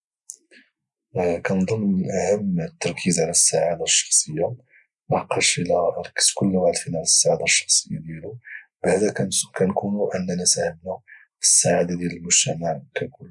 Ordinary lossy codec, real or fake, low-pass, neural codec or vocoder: none; real; 10.8 kHz; none